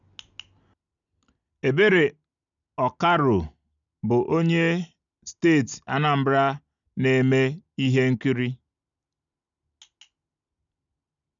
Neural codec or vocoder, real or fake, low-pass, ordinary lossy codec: none; real; 7.2 kHz; none